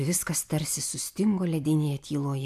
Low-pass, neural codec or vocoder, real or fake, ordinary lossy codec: 14.4 kHz; none; real; AAC, 96 kbps